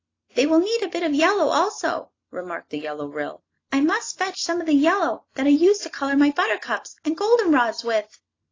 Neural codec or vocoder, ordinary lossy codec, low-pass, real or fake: none; AAC, 32 kbps; 7.2 kHz; real